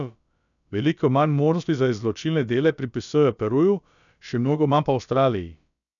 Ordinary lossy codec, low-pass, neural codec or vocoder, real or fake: none; 7.2 kHz; codec, 16 kHz, about 1 kbps, DyCAST, with the encoder's durations; fake